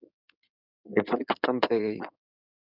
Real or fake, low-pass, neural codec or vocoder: fake; 5.4 kHz; codec, 16 kHz in and 24 kHz out, 2.2 kbps, FireRedTTS-2 codec